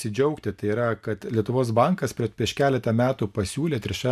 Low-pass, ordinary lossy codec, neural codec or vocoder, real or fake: 14.4 kHz; AAC, 96 kbps; none; real